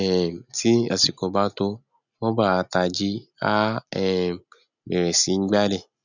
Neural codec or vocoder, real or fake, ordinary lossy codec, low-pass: none; real; none; 7.2 kHz